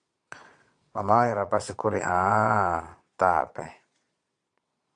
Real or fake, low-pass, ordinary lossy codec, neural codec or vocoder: fake; 9.9 kHz; AAC, 64 kbps; vocoder, 22.05 kHz, 80 mel bands, Vocos